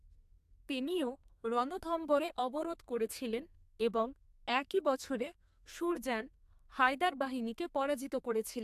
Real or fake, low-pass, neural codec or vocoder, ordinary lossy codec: fake; 14.4 kHz; codec, 32 kHz, 1.9 kbps, SNAC; AAC, 96 kbps